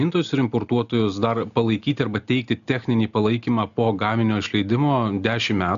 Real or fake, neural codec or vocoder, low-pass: real; none; 7.2 kHz